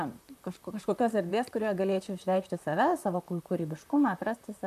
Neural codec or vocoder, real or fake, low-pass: vocoder, 44.1 kHz, 128 mel bands, Pupu-Vocoder; fake; 14.4 kHz